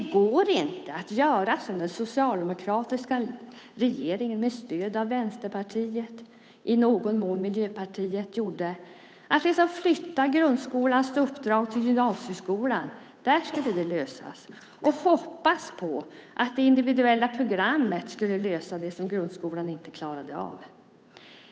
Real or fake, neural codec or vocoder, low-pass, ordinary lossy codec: fake; codec, 16 kHz, 8 kbps, FunCodec, trained on Chinese and English, 25 frames a second; none; none